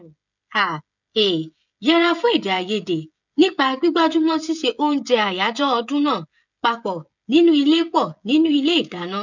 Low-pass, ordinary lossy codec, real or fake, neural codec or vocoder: 7.2 kHz; none; fake; codec, 16 kHz, 16 kbps, FreqCodec, smaller model